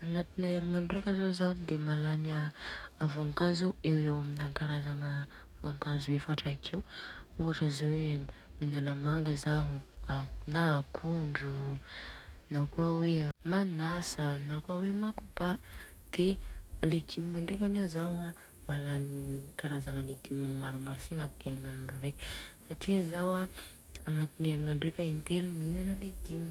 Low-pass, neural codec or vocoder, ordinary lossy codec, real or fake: 19.8 kHz; codec, 44.1 kHz, 2.6 kbps, DAC; none; fake